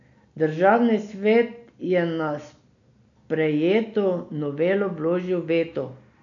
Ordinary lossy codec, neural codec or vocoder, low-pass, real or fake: none; none; 7.2 kHz; real